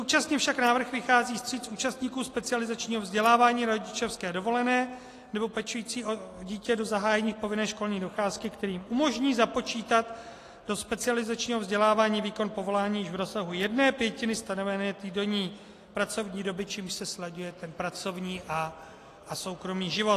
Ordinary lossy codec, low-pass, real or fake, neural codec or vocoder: AAC, 48 kbps; 14.4 kHz; real; none